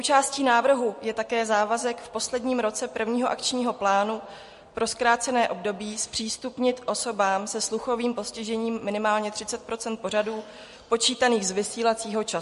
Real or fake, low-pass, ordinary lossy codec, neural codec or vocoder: real; 14.4 kHz; MP3, 48 kbps; none